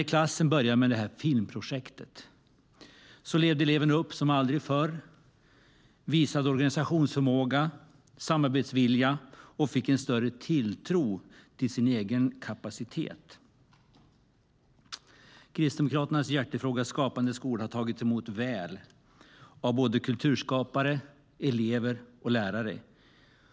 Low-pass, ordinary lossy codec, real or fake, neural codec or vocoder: none; none; real; none